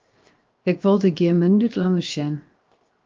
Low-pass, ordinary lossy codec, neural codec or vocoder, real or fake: 7.2 kHz; Opus, 24 kbps; codec, 16 kHz, 0.7 kbps, FocalCodec; fake